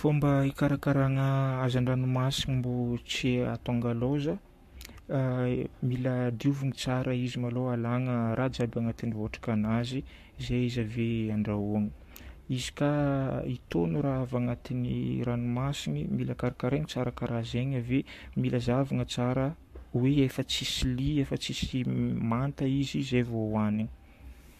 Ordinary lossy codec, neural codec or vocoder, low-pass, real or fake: MP3, 64 kbps; codec, 44.1 kHz, 7.8 kbps, Pupu-Codec; 14.4 kHz; fake